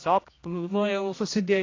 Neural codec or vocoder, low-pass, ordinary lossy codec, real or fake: codec, 16 kHz, 1 kbps, X-Codec, HuBERT features, trained on general audio; 7.2 kHz; AAC, 32 kbps; fake